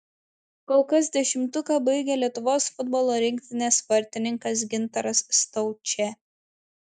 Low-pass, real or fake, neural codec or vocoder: 10.8 kHz; real; none